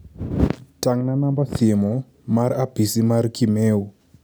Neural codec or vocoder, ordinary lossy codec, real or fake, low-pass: none; none; real; none